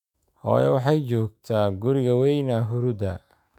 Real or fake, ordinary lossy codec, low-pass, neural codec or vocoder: fake; none; 19.8 kHz; autoencoder, 48 kHz, 128 numbers a frame, DAC-VAE, trained on Japanese speech